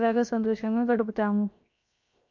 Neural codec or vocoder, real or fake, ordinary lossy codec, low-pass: codec, 16 kHz, 0.7 kbps, FocalCodec; fake; MP3, 48 kbps; 7.2 kHz